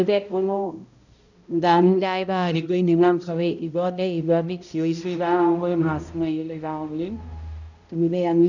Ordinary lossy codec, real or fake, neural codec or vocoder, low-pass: none; fake; codec, 16 kHz, 0.5 kbps, X-Codec, HuBERT features, trained on balanced general audio; 7.2 kHz